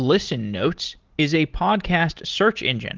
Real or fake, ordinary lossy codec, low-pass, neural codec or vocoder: real; Opus, 16 kbps; 7.2 kHz; none